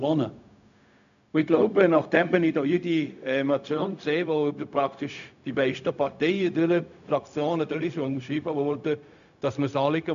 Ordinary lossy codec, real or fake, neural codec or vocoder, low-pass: none; fake; codec, 16 kHz, 0.4 kbps, LongCat-Audio-Codec; 7.2 kHz